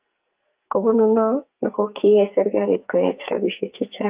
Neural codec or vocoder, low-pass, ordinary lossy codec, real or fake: codec, 44.1 kHz, 2.6 kbps, SNAC; 3.6 kHz; Opus, 32 kbps; fake